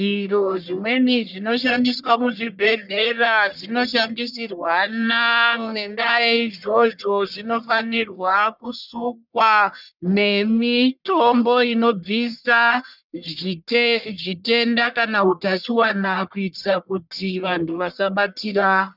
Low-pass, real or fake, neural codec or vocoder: 5.4 kHz; fake; codec, 44.1 kHz, 1.7 kbps, Pupu-Codec